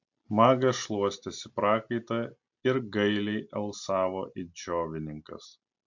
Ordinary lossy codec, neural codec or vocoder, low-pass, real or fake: MP3, 48 kbps; none; 7.2 kHz; real